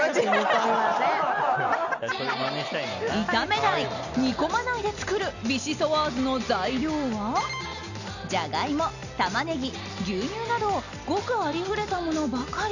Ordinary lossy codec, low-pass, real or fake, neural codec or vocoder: none; 7.2 kHz; real; none